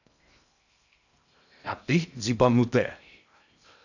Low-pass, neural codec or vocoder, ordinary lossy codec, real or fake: 7.2 kHz; codec, 16 kHz in and 24 kHz out, 0.6 kbps, FocalCodec, streaming, 2048 codes; none; fake